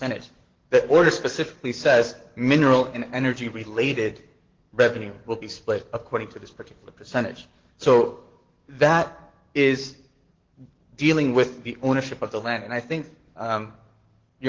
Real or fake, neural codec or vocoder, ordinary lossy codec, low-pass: fake; codec, 44.1 kHz, 7.8 kbps, DAC; Opus, 16 kbps; 7.2 kHz